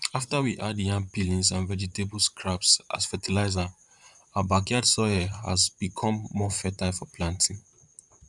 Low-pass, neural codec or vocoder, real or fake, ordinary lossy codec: 10.8 kHz; vocoder, 44.1 kHz, 128 mel bands, Pupu-Vocoder; fake; none